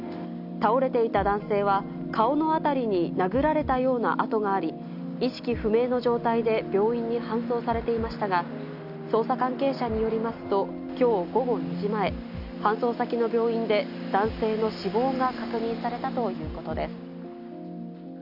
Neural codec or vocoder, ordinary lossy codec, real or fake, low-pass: none; none; real; 5.4 kHz